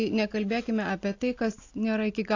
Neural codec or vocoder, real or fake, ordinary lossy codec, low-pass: none; real; AAC, 48 kbps; 7.2 kHz